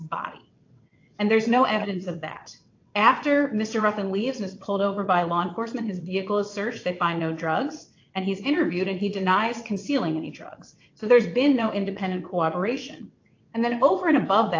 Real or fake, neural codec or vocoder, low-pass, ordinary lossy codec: fake; vocoder, 22.05 kHz, 80 mel bands, WaveNeXt; 7.2 kHz; AAC, 48 kbps